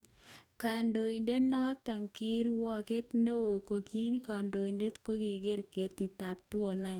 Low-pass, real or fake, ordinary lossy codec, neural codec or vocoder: 19.8 kHz; fake; none; codec, 44.1 kHz, 2.6 kbps, DAC